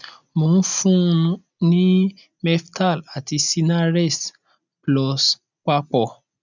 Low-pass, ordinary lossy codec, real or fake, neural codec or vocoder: 7.2 kHz; none; real; none